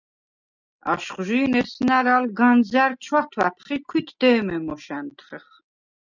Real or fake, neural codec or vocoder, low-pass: real; none; 7.2 kHz